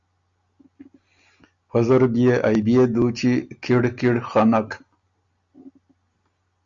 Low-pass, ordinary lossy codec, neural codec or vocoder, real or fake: 7.2 kHz; Opus, 64 kbps; none; real